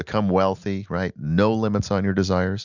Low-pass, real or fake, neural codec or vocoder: 7.2 kHz; real; none